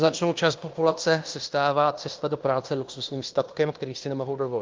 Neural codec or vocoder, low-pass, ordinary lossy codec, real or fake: codec, 16 kHz in and 24 kHz out, 0.9 kbps, LongCat-Audio-Codec, fine tuned four codebook decoder; 7.2 kHz; Opus, 24 kbps; fake